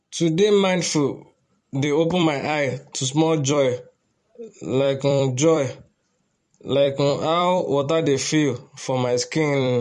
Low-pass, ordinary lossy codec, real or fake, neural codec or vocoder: 14.4 kHz; MP3, 48 kbps; fake; vocoder, 44.1 kHz, 128 mel bands every 256 samples, BigVGAN v2